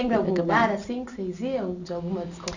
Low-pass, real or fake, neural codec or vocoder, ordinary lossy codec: 7.2 kHz; real; none; none